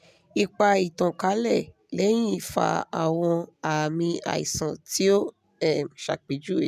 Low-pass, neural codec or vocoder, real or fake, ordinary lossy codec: 14.4 kHz; none; real; none